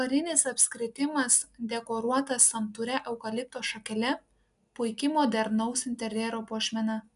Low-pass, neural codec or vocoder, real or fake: 10.8 kHz; none; real